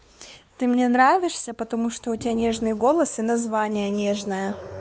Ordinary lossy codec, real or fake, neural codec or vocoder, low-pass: none; fake; codec, 16 kHz, 4 kbps, X-Codec, WavLM features, trained on Multilingual LibriSpeech; none